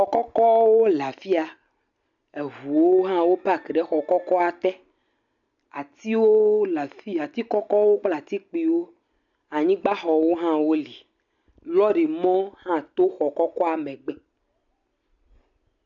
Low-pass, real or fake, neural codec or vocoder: 7.2 kHz; real; none